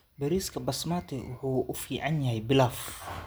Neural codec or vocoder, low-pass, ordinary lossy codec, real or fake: none; none; none; real